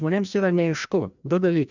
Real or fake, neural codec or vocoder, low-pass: fake; codec, 16 kHz, 1 kbps, FreqCodec, larger model; 7.2 kHz